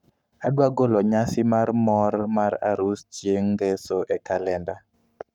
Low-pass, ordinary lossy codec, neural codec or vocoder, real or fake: 19.8 kHz; none; codec, 44.1 kHz, 7.8 kbps, Pupu-Codec; fake